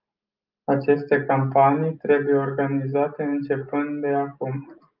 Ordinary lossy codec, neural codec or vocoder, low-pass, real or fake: Opus, 32 kbps; none; 5.4 kHz; real